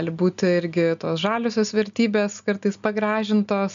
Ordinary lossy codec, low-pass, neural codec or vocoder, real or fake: AAC, 96 kbps; 7.2 kHz; none; real